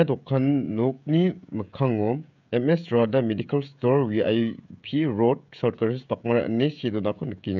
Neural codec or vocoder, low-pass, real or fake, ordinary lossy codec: codec, 16 kHz, 16 kbps, FreqCodec, smaller model; 7.2 kHz; fake; none